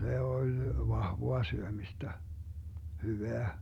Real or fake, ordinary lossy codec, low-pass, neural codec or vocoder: real; none; 19.8 kHz; none